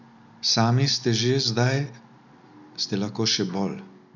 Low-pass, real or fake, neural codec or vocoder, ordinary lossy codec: 7.2 kHz; real; none; none